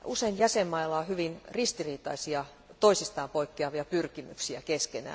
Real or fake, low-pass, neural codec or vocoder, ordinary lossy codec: real; none; none; none